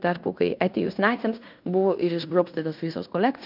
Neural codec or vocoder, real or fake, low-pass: codec, 16 kHz in and 24 kHz out, 0.9 kbps, LongCat-Audio-Codec, fine tuned four codebook decoder; fake; 5.4 kHz